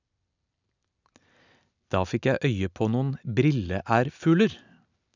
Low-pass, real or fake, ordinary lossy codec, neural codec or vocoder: 7.2 kHz; real; none; none